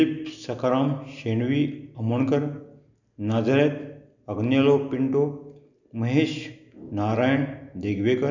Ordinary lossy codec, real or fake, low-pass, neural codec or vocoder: none; real; 7.2 kHz; none